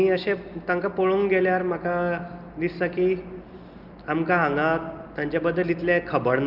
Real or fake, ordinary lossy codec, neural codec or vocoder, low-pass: real; Opus, 24 kbps; none; 5.4 kHz